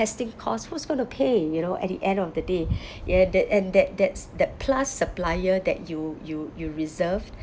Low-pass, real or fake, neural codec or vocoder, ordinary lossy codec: none; real; none; none